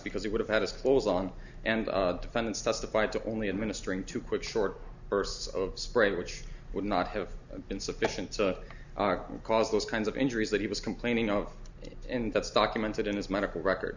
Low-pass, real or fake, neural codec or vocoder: 7.2 kHz; real; none